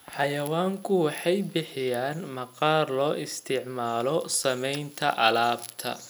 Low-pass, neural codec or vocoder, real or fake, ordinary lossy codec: none; none; real; none